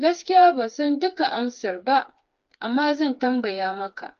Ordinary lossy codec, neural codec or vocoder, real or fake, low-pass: Opus, 24 kbps; codec, 16 kHz, 4 kbps, FreqCodec, smaller model; fake; 7.2 kHz